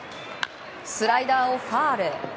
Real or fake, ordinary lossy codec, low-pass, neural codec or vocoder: real; none; none; none